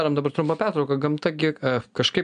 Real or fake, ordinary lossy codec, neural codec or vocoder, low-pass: real; MP3, 96 kbps; none; 9.9 kHz